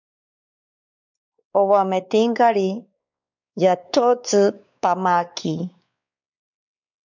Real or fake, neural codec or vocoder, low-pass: fake; codec, 16 kHz, 4 kbps, X-Codec, WavLM features, trained on Multilingual LibriSpeech; 7.2 kHz